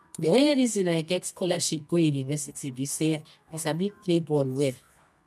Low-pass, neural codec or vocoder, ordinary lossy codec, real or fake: none; codec, 24 kHz, 0.9 kbps, WavTokenizer, medium music audio release; none; fake